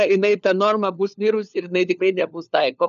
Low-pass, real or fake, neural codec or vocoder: 7.2 kHz; fake; codec, 16 kHz, 4 kbps, FunCodec, trained on LibriTTS, 50 frames a second